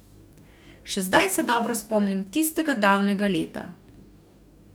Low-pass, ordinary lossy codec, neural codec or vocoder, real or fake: none; none; codec, 44.1 kHz, 2.6 kbps, DAC; fake